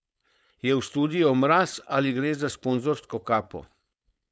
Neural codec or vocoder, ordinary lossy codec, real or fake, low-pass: codec, 16 kHz, 4.8 kbps, FACodec; none; fake; none